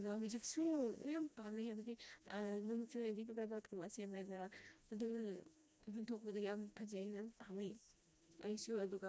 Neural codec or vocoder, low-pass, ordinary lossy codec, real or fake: codec, 16 kHz, 1 kbps, FreqCodec, smaller model; none; none; fake